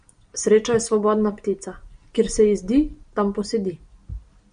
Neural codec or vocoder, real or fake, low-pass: none; real; 9.9 kHz